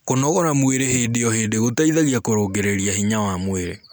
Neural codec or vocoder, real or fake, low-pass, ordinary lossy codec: none; real; none; none